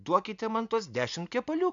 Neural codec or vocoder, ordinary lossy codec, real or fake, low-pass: none; MP3, 96 kbps; real; 7.2 kHz